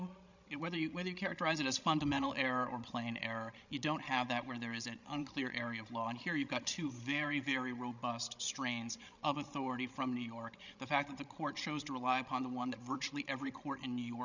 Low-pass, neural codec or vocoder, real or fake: 7.2 kHz; codec, 16 kHz, 16 kbps, FreqCodec, larger model; fake